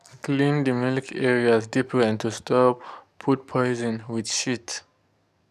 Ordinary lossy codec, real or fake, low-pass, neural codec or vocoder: none; fake; 14.4 kHz; codec, 44.1 kHz, 7.8 kbps, DAC